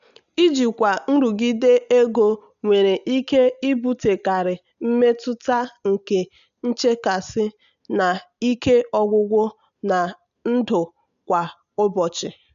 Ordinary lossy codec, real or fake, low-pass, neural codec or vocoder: none; real; 7.2 kHz; none